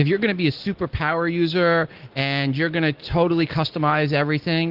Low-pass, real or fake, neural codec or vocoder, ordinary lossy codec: 5.4 kHz; real; none; Opus, 16 kbps